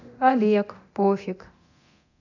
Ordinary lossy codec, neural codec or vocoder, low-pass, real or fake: none; codec, 24 kHz, 0.9 kbps, DualCodec; 7.2 kHz; fake